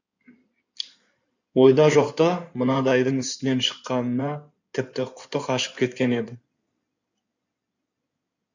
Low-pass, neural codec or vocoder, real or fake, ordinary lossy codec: 7.2 kHz; codec, 16 kHz in and 24 kHz out, 2.2 kbps, FireRedTTS-2 codec; fake; none